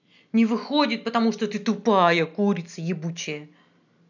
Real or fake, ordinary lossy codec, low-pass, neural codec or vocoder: real; none; 7.2 kHz; none